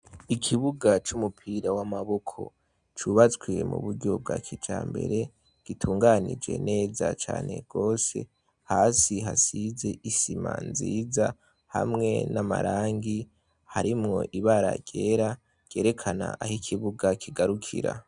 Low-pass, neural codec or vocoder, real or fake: 9.9 kHz; none; real